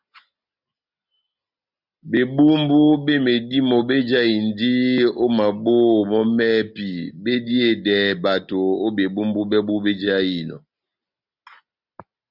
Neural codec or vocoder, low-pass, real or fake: none; 5.4 kHz; real